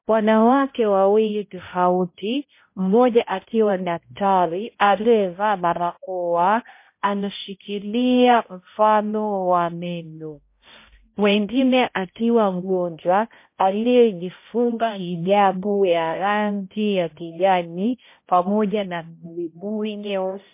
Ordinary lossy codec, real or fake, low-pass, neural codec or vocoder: MP3, 24 kbps; fake; 3.6 kHz; codec, 16 kHz, 0.5 kbps, X-Codec, HuBERT features, trained on balanced general audio